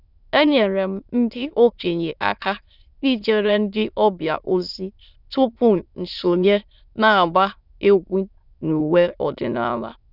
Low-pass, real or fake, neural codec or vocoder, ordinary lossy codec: 5.4 kHz; fake; autoencoder, 22.05 kHz, a latent of 192 numbers a frame, VITS, trained on many speakers; none